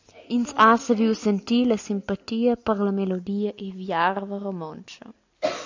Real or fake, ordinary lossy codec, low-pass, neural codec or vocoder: real; AAC, 48 kbps; 7.2 kHz; none